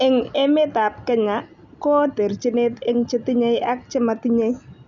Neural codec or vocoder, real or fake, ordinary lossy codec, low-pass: none; real; none; 7.2 kHz